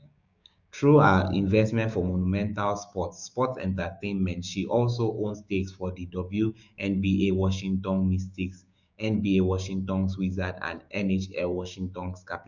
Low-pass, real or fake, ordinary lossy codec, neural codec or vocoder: 7.2 kHz; fake; none; codec, 16 kHz, 6 kbps, DAC